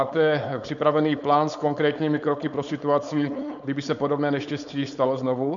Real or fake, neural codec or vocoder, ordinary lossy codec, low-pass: fake; codec, 16 kHz, 4.8 kbps, FACodec; MP3, 96 kbps; 7.2 kHz